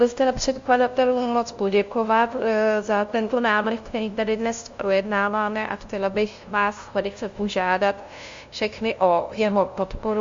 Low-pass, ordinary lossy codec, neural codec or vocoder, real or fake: 7.2 kHz; MP3, 48 kbps; codec, 16 kHz, 0.5 kbps, FunCodec, trained on LibriTTS, 25 frames a second; fake